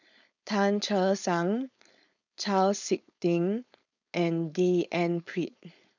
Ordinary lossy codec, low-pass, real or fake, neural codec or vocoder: none; 7.2 kHz; fake; codec, 16 kHz, 4.8 kbps, FACodec